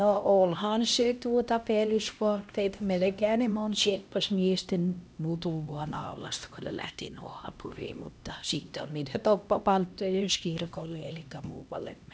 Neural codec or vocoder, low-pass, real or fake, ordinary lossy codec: codec, 16 kHz, 1 kbps, X-Codec, HuBERT features, trained on LibriSpeech; none; fake; none